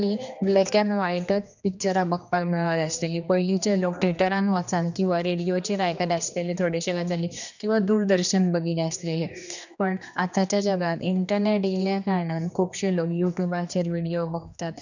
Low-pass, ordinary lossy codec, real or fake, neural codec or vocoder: 7.2 kHz; none; fake; codec, 16 kHz, 2 kbps, X-Codec, HuBERT features, trained on general audio